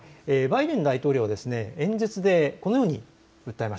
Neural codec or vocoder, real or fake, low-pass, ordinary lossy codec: none; real; none; none